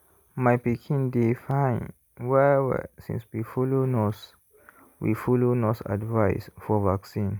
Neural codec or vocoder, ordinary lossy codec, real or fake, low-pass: none; none; real; none